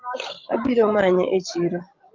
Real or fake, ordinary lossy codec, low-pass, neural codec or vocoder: fake; Opus, 32 kbps; 7.2 kHz; codec, 16 kHz, 16 kbps, FreqCodec, larger model